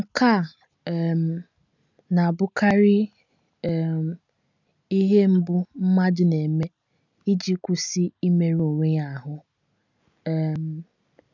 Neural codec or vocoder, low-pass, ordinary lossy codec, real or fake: none; 7.2 kHz; none; real